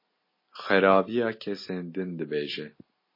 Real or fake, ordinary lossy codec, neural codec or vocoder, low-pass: real; MP3, 24 kbps; none; 5.4 kHz